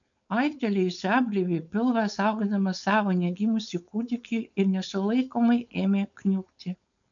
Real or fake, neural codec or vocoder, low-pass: fake; codec, 16 kHz, 4.8 kbps, FACodec; 7.2 kHz